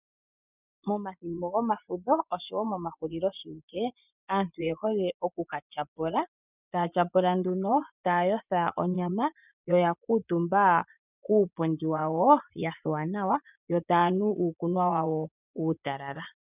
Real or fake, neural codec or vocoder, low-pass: fake; vocoder, 24 kHz, 100 mel bands, Vocos; 3.6 kHz